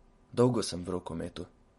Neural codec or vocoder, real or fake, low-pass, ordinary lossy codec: none; real; 19.8 kHz; MP3, 48 kbps